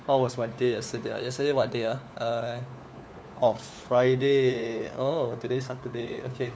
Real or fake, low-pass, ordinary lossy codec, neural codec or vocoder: fake; none; none; codec, 16 kHz, 4 kbps, FunCodec, trained on LibriTTS, 50 frames a second